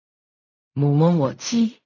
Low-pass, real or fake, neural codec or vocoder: 7.2 kHz; fake; codec, 16 kHz in and 24 kHz out, 0.4 kbps, LongCat-Audio-Codec, fine tuned four codebook decoder